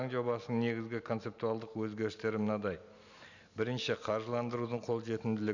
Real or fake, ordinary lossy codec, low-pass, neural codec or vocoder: real; none; 7.2 kHz; none